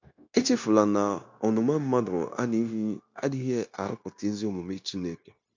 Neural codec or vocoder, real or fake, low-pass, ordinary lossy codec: codec, 16 kHz, 0.9 kbps, LongCat-Audio-Codec; fake; 7.2 kHz; MP3, 48 kbps